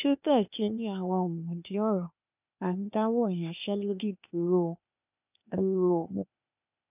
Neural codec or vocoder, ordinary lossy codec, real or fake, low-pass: codec, 16 kHz, 0.8 kbps, ZipCodec; none; fake; 3.6 kHz